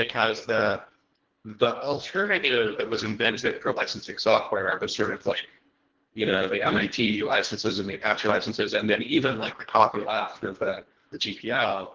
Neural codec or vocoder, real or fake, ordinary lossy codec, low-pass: codec, 24 kHz, 1.5 kbps, HILCodec; fake; Opus, 16 kbps; 7.2 kHz